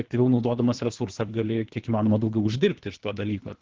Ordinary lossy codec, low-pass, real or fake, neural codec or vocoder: Opus, 16 kbps; 7.2 kHz; fake; codec, 24 kHz, 3 kbps, HILCodec